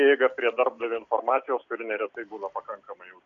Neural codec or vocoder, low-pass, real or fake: none; 7.2 kHz; real